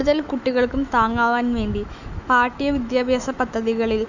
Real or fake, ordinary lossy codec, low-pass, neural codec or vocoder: fake; none; 7.2 kHz; autoencoder, 48 kHz, 128 numbers a frame, DAC-VAE, trained on Japanese speech